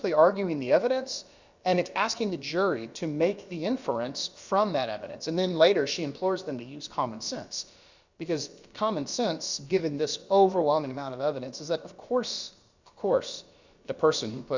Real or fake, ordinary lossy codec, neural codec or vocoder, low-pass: fake; Opus, 64 kbps; codec, 16 kHz, about 1 kbps, DyCAST, with the encoder's durations; 7.2 kHz